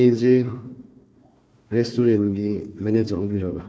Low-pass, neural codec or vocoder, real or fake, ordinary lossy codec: none; codec, 16 kHz, 1 kbps, FunCodec, trained on Chinese and English, 50 frames a second; fake; none